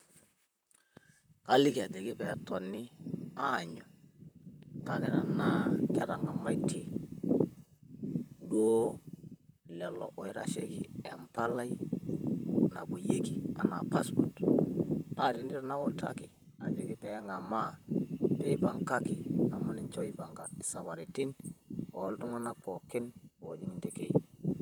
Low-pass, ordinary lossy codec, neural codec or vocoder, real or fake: none; none; vocoder, 44.1 kHz, 128 mel bands, Pupu-Vocoder; fake